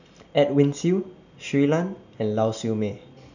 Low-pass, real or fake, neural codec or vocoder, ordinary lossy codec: 7.2 kHz; real; none; none